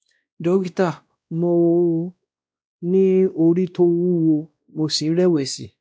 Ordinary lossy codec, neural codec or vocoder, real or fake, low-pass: none; codec, 16 kHz, 1 kbps, X-Codec, WavLM features, trained on Multilingual LibriSpeech; fake; none